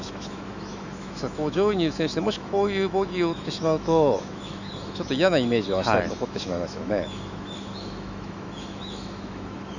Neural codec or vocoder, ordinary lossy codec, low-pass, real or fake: autoencoder, 48 kHz, 128 numbers a frame, DAC-VAE, trained on Japanese speech; none; 7.2 kHz; fake